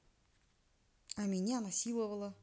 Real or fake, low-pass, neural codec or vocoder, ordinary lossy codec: real; none; none; none